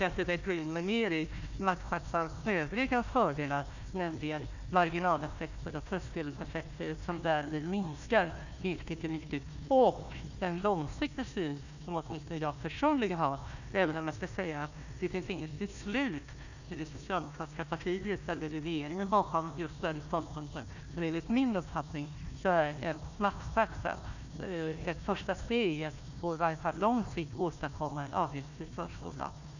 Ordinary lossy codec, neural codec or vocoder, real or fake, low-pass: none; codec, 16 kHz, 1 kbps, FunCodec, trained on Chinese and English, 50 frames a second; fake; 7.2 kHz